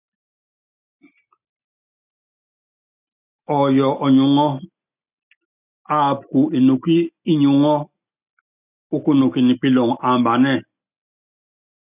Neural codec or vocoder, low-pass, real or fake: none; 3.6 kHz; real